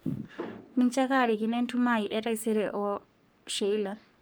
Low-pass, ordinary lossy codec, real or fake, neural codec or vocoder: none; none; fake; codec, 44.1 kHz, 3.4 kbps, Pupu-Codec